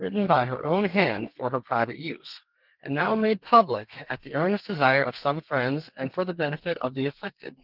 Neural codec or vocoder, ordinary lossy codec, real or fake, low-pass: codec, 32 kHz, 1.9 kbps, SNAC; Opus, 32 kbps; fake; 5.4 kHz